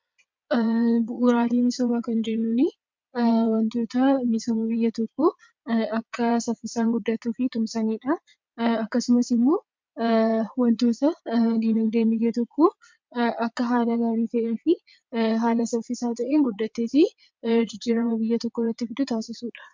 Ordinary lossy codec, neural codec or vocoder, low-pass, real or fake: MP3, 64 kbps; vocoder, 22.05 kHz, 80 mel bands, WaveNeXt; 7.2 kHz; fake